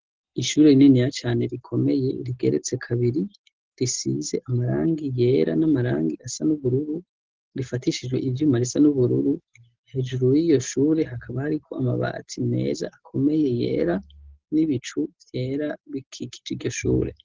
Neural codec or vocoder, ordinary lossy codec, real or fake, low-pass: none; Opus, 16 kbps; real; 7.2 kHz